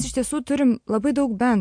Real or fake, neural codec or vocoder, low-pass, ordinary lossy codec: real; none; 9.9 kHz; MP3, 64 kbps